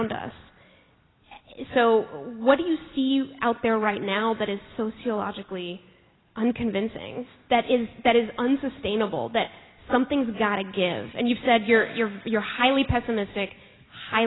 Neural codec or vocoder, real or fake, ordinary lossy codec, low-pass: none; real; AAC, 16 kbps; 7.2 kHz